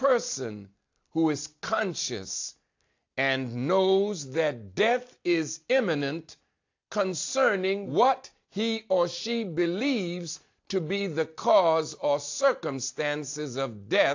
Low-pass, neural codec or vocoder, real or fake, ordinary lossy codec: 7.2 kHz; none; real; AAC, 48 kbps